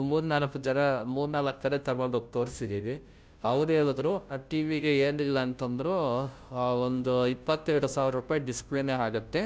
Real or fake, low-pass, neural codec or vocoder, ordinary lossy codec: fake; none; codec, 16 kHz, 0.5 kbps, FunCodec, trained on Chinese and English, 25 frames a second; none